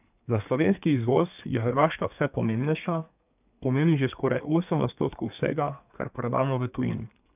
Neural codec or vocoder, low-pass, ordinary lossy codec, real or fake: codec, 32 kHz, 1.9 kbps, SNAC; 3.6 kHz; none; fake